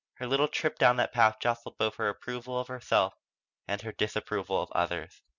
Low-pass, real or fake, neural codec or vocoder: 7.2 kHz; real; none